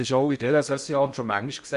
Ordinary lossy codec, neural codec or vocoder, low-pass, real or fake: none; codec, 16 kHz in and 24 kHz out, 0.6 kbps, FocalCodec, streaming, 2048 codes; 10.8 kHz; fake